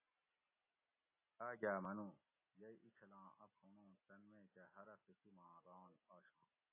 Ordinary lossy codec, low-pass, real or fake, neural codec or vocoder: AAC, 24 kbps; 3.6 kHz; real; none